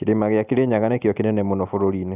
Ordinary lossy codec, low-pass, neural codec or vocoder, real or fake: none; 3.6 kHz; none; real